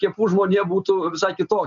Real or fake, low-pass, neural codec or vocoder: real; 7.2 kHz; none